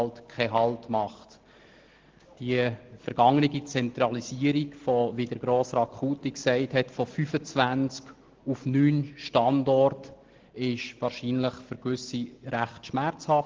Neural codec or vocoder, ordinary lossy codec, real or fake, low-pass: none; Opus, 16 kbps; real; 7.2 kHz